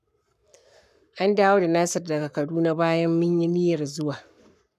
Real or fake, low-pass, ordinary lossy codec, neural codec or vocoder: fake; 14.4 kHz; none; codec, 44.1 kHz, 7.8 kbps, Pupu-Codec